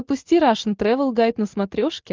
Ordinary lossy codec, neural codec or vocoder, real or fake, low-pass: Opus, 24 kbps; none; real; 7.2 kHz